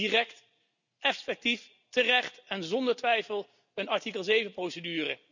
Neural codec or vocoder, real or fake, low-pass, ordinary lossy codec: none; real; 7.2 kHz; none